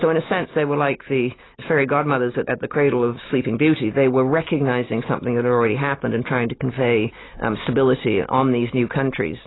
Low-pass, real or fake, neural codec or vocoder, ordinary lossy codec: 7.2 kHz; real; none; AAC, 16 kbps